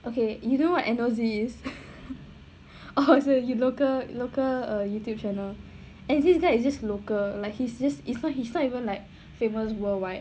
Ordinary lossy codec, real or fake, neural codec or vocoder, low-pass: none; real; none; none